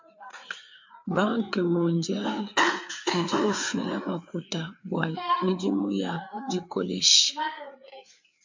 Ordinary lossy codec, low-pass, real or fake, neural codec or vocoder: MP3, 64 kbps; 7.2 kHz; fake; codec, 16 kHz, 4 kbps, FreqCodec, larger model